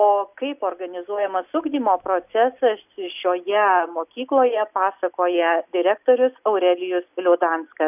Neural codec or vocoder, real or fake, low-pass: none; real; 3.6 kHz